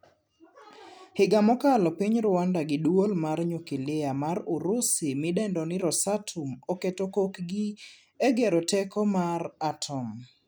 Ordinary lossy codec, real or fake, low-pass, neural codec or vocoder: none; real; none; none